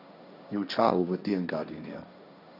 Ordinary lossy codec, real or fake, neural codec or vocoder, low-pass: none; fake; codec, 16 kHz, 1.1 kbps, Voila-Tokenizer; 5.4 kHz